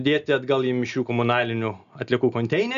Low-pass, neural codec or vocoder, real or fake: 7.2 kHz; none; real